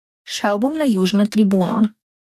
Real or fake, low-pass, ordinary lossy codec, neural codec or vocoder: fake; 14.4 kHz; none; codec, 44.1 kHz, 2.6 kbps, DAC